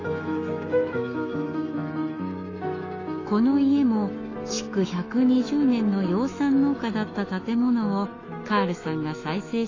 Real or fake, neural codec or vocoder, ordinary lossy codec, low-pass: fake; autoencoder, 48 kHz, 128 numbers a frame, DAC-VAE, trained on Japanese speech; AAC, 32 kbps; 7.2 kHz